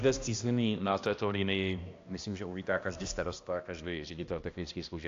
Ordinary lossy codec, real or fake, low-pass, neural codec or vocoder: AAC, 48 kbps; fake; 7.2 kHz; codec, 16 kHz, 1 kbps, X-Codec, HuBERT features, trained on balanced general audio